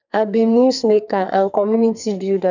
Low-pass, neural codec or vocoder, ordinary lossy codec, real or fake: 7.2 kHz; codec, 44.1 kHz, 2.6 kbps, SNAC; none; fake